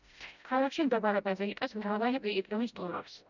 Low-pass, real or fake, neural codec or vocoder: 7.2 kHz; fake; codec, 16 kHz, 0.5 kbps, FreqCodec, smaller model